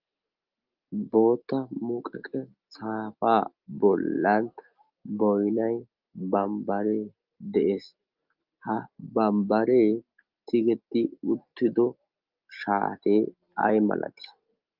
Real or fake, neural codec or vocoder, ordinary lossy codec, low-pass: real; none; Opus, 32 kbps; 5.4 kHz